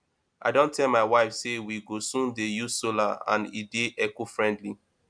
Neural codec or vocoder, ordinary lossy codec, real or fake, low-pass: none; MP3, 96 kbps; real; 9.9 kHz